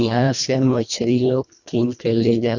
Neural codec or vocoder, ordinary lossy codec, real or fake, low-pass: codec, 24 kHz, 1.5 kbps, HILCodec; none; fake; 7.2 kHz